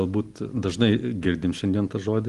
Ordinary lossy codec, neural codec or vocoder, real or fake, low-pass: Opus, 24 kbps; none; real; 10.8 kHz